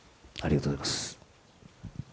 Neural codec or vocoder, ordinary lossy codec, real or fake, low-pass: none; none; real; none